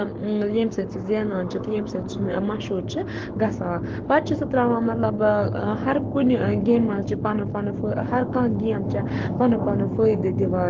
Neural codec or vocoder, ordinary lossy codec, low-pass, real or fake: codec, 44.1 kHz, 7.8 kbps, Pupu-Codec; Opus, 16 kbps; 7.2 kHz; fake